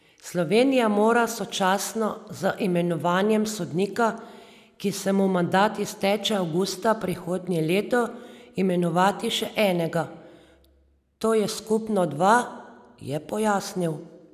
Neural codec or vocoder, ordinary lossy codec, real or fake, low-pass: none; none; real; 14.4 kHz